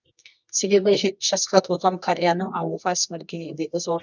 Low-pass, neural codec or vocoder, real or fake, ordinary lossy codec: 7.2 kHz; codec, 24 kHz, 0.9 kbps, WavTokenizer, medium music audio release; fake; none